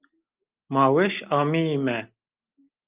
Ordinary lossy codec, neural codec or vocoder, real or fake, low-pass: Opus, 24 kbps; none; real; 3.6 kHz